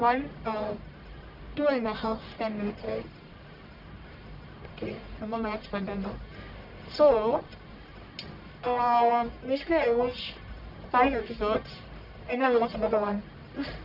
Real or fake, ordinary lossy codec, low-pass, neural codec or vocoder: fake; none; 5.4 kHz; codec, 44.1 kHz, 1.7 kbps, Pupu-Codec